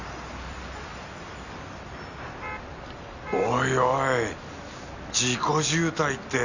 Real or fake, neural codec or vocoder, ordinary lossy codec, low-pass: real; none; none; 7.2 kHz